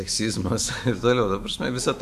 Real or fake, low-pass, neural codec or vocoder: fake; 14.4 kHz; autoencoder, 48 kHz, 128 numbers a frame, DAC-VAE, trained on Japanese speech